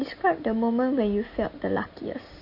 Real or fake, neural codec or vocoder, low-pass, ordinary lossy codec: real; none; 5.4 kHz; MP3, 32 kbps